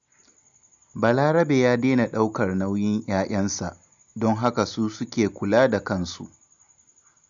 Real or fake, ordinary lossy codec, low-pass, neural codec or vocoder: real; none; 7.2 kHz; none